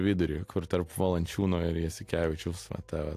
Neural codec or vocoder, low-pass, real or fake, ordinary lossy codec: none; 14.4 kHz; real; AAC, 64 kbps